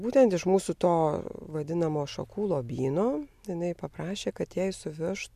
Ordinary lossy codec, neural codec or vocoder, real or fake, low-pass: MP3, 96 kbps; none; real; 14.4 kHz